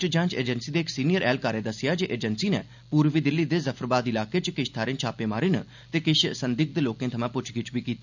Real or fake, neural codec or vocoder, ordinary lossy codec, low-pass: real; none; none; 7.2 kHz